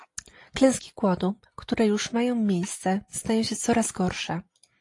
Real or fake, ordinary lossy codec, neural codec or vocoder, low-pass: real; AAC, 48 kbps; none; 10.8 kHz